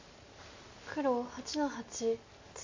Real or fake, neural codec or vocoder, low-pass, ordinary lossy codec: real; none; 7.2 kHz; MP3, 64 kbps